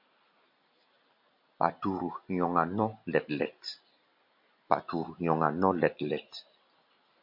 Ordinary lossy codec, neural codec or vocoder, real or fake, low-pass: MP3, 48 kbps; none; real; 5.4 kHz